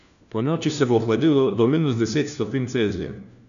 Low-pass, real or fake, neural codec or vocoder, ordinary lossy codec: 7.2 kHz; fake; codec, 16 kHz, 1 kbps, FunCodec, trained on LibriTTS, 50 frames a second; none